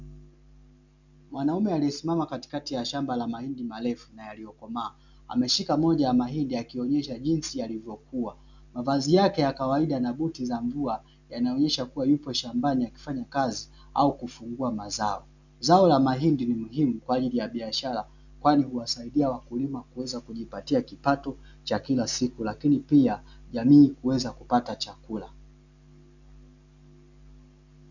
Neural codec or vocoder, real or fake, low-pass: none; real; 7.2 kHz